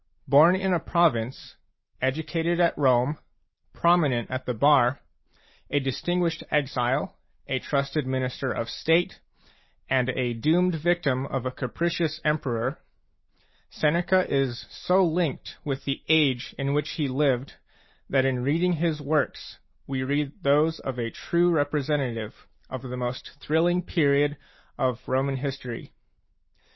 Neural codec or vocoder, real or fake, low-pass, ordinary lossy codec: none; real; 7.2 kHz; MP3, 24 kbps